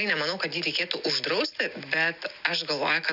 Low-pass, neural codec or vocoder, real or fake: 5.4 kHz; none; real